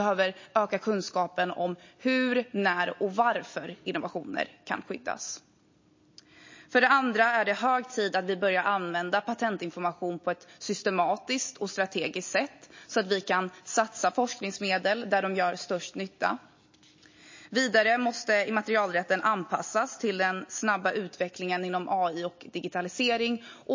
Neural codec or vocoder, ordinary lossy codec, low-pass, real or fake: none; MP3, 32 kbps; 7.2 kHz; real